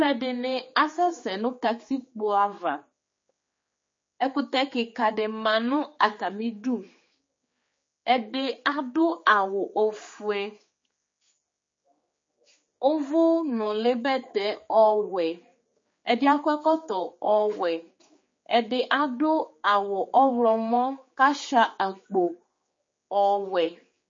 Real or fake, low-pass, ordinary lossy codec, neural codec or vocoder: fake; 7.2 kHz; MP3, 32 kbps; codec, 16 kHz, 4 kbps, X-Codec, HuBERT features, trained on general audio